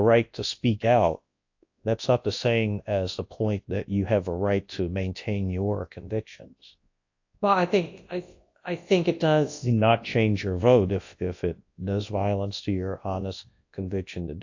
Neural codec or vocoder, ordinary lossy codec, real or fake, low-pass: codec, 24 kHz, 0.9 kbps, WavTokenizer, large speech release; AAC, 48 kbps; fake; 7.2 kHz